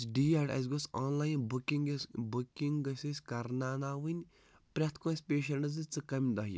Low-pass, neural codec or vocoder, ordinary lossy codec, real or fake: none; none; none; real